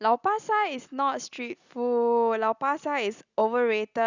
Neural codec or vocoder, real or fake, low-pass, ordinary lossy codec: none; real; 7.2 kHz; Opus, 64 kbps